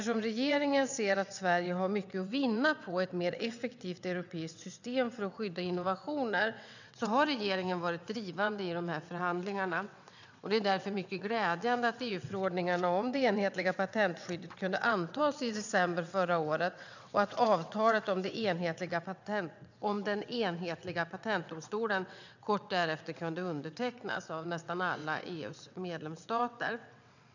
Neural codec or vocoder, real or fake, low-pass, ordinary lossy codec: vocoder, 22.05 kHz, 80 mel bands, WaveNeXt; fake; 7.2 kHz; none